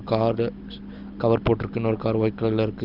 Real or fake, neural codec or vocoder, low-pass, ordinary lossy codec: real; none; 5.4 kHz; Opus, 24 kbps